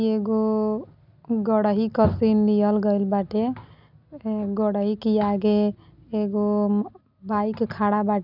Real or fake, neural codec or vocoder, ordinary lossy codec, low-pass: real; none; none; 5.4 kHz